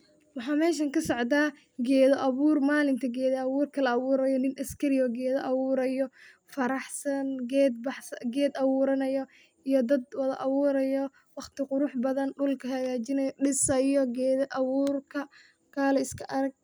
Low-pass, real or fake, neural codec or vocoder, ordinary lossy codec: none; real; none; none